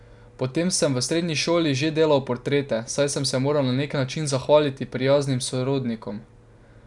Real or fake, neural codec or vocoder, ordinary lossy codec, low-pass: real; none; none; 10.8 kHz